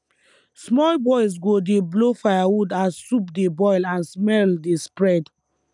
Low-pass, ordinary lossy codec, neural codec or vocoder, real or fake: 10.8 kHz; none; none; real